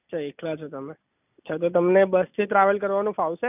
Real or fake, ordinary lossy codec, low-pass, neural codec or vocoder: real; none; 3.6 kHz; none